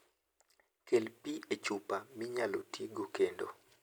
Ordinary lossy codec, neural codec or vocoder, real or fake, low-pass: none; none; real; none